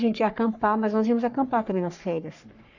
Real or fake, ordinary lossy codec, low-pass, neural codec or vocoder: fake; none; 7.2 kHz; codec, 44.1 kHz, 3.4 kbps, Pupu-Codec